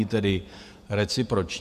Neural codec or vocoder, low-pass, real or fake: vocoder, 48 kHz, 128 mel bands, Vocos; 14.4 kHz; fake